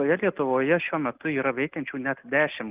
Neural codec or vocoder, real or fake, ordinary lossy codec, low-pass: none; real; Opus, 24 kbps; 3.6 kHz